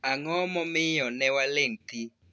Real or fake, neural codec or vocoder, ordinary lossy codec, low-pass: real; none; none; none